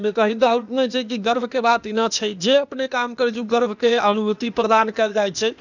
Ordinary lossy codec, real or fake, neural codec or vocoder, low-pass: none; fake; codec, 16 kHz, 0.8 kbps, ZipCodec; 7.2 kHz